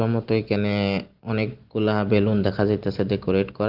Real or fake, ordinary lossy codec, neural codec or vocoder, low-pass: real; Opus, 16 kbps; none; 5.4 kHz